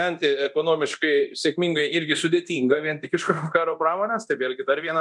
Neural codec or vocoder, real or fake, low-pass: codec, 24 kHz, 0.9 kbps, DualCodec; fake; 10.8 kHz